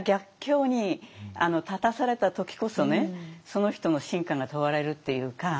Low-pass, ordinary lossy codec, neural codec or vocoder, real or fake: none; none; none; real